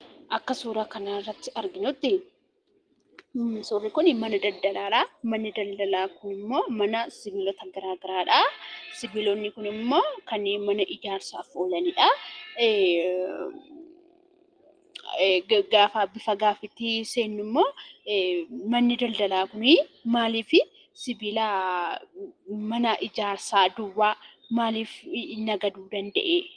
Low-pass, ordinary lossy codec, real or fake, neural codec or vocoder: 9.9 kHz; Opus, 24 kbps; real; none